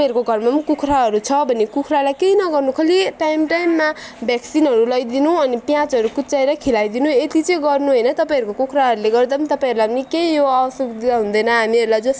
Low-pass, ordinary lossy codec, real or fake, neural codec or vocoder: none; none; real; none